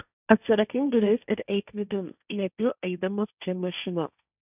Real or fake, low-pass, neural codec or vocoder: fake; 3.6 kHz; codec, 16 kHz, 1.1 kbps, Voila-Tokenizer